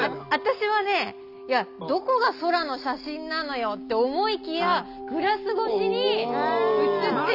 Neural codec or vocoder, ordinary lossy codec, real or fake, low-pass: none; none; real; 5.4 kHz